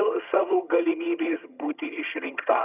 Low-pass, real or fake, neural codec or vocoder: 3.6 kHz; fake; vocoder, 22.05 kHz, 80 mel bands, HiFi-GAN